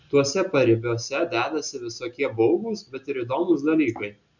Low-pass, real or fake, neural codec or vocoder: 7.2 kHz; real; none